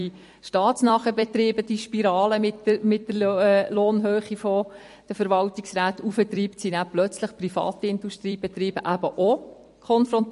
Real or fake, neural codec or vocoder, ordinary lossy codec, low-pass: fake; vocoder, 44.1 kHz, 128 mel bands every 256 samples, BigVGAN v2; MP3, 48 kbps; 14.4 kHz